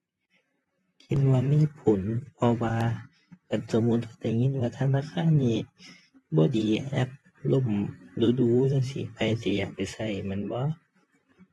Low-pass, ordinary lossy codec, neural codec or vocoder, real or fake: 19.8 kHz; AAC, 32 kbps; vocoder, 44.1 kHz, 128 mel bands, Pupu-Vocoder; fake